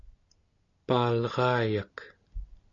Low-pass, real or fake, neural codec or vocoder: 7.2 kHz; real; none